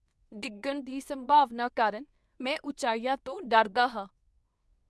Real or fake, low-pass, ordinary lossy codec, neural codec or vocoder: fake; none; none; codec, 24 kHz, 0.9 kbps, WavTokenizer, medium speech release version 2